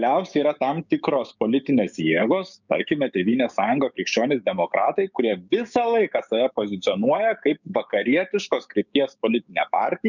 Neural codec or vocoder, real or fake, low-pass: codec, 16 kHz, 6 kbps, DAC; fake; 7.2 kHz